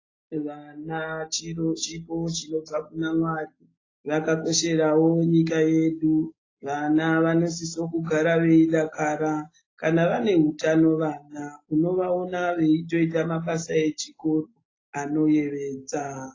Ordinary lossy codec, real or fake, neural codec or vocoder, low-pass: AAC, 32 kbps; real; none; 7.2 kHz